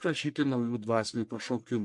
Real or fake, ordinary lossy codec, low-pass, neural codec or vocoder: fake; MP3, 64 kbps; 10.8 kHz; codec, 44.1 kHz, 1.7 kbps, Pupu-Codec